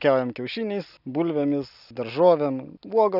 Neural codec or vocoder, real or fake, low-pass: none; real; 5.4 kHz